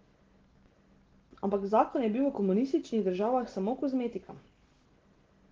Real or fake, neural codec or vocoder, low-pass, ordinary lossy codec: real; none; 7.2 kHz; Opus, 16 kbps